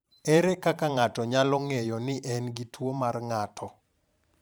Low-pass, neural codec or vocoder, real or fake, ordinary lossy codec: none; vocoder, 44.1 kHz, 128 mel bands every 512 samples, BigVGAN v2; fake; none